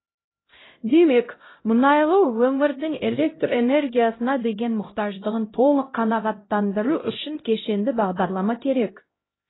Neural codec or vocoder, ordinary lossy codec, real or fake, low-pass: codec, 16 kHz, 0.5 kbps, X-Codec, HuBERT features, trained on LibriSpeech; AAC, 16 kbps; fake; 7.2 kHz